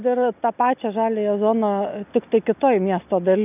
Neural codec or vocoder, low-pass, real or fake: none; 3.6 kHz; real